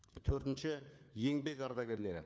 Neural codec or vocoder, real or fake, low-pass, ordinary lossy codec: codec, 16 kHz, 4 kbps, FunCodec, trained on LibriTTS, 50 frames a second; fake; none; none